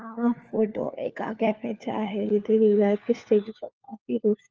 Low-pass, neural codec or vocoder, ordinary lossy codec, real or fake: 7.2 kHz; codec, 16 kHz, 4 kbps, FunCodec, trained on LibriTTS, 50 frames a second; Opus, 24 kbps; fake